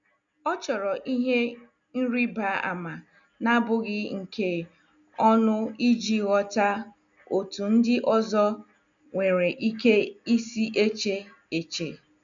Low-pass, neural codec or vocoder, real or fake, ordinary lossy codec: 7.2 kHz; none; real; none